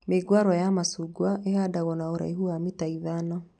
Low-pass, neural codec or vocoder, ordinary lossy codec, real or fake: 9.9 kHz; none; none; real